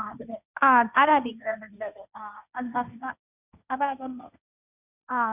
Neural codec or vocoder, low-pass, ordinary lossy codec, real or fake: codec, 16 kHz, 1.1 kbps, Voila-Tokenizer; 3.6 kHz; none; fake